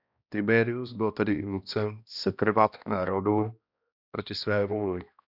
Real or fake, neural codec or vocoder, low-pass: fake; codec, 16 kHz, 1 kbps, X-Codec, HuBERT features, trained on balanced general audio; 5.4 kHz